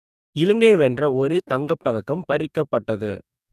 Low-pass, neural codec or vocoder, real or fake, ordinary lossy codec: 14.4 kHz; codec, 44.1 kHz, 2.6 kbps, DAC; fake; none